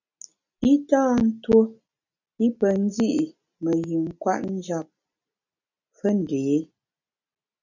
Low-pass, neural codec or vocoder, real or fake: 7.2 kHz; none; real